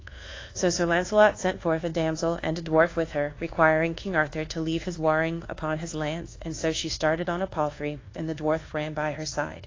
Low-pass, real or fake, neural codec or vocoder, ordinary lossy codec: 7.2 kHz; fake; codec, 24 kHz, 1.2 kbps, DualCodec; AAC, 32 kbps